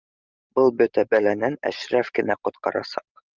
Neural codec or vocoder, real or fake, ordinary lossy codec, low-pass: none; real; Opus, 32 kbps; 7.2 kHz